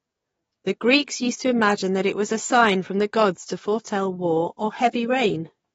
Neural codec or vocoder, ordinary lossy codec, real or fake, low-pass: codec, 44.1 kHz, 7.8 kbps, DAC; AAC, 24 kbps; fake; 19.8 kHz